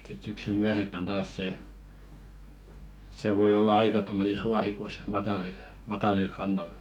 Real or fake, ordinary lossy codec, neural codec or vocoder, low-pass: fake; none; codec, 44.1 kHz, 2.6 kbps, DAC; 19.8 kHz